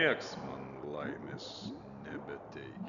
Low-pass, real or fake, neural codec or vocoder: 7.2 kHz; real; none